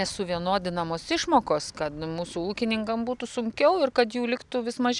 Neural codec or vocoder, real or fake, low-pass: none; real; 10.8 kHz